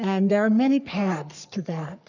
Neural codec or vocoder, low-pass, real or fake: codec, 44.1 kHz, 3.4 kbps, Pupu-Codec; 7.2 kHz; fake